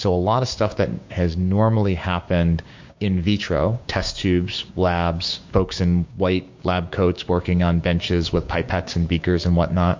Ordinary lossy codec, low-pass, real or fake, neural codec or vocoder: MP3, 48 kbps; 7.2 kHz; fake; codec, 16 kHz, 6 kbps, DAC